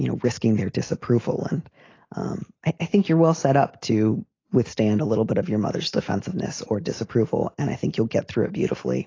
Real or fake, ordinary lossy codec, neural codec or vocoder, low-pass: real; AAC, 32 kbps; none; 7.2 kHz